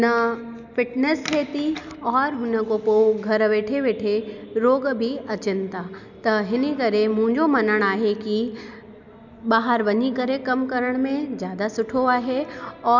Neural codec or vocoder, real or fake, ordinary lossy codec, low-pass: none; real; none; 7.2 kHz